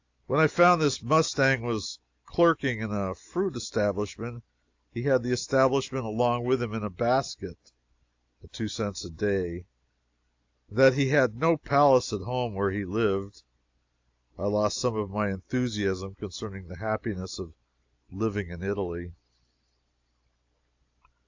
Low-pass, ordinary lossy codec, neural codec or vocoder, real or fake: 7.2 kHz; AAC, 48 kbps; none; real